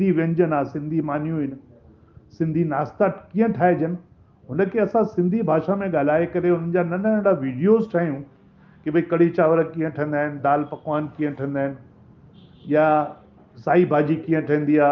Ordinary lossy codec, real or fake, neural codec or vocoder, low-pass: Opus, 32 kbps; real; none; 7.2 kHz